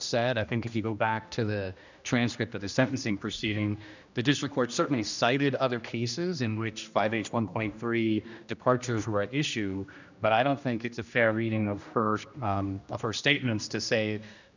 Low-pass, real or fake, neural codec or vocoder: 7.2 kHz; fake; codec, 16 kHz, 1 kbps, X-Codec, HuBERT features, trained on general audio